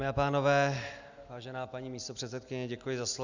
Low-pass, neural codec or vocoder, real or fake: 7.2 kHz; none; real